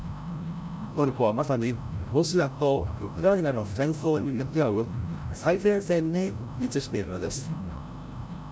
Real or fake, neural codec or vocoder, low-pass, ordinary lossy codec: fake; codec, 16 kHz, 0.5 kbps, FreqCodec, larger model; none; none